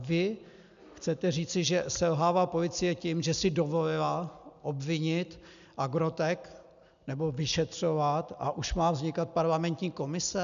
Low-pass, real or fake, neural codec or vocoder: 7.2 kHz; real; none